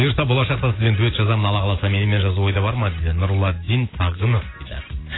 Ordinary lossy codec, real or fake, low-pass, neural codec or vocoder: AAC, 16 kbps; real; 7.2 kHz; none